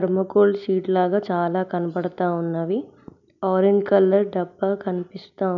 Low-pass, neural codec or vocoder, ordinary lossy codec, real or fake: 7.2 kHz; none; none; real